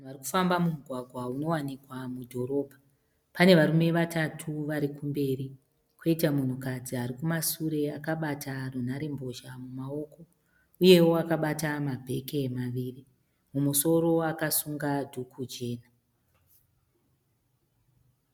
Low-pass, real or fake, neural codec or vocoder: 19.8 kHz; real; none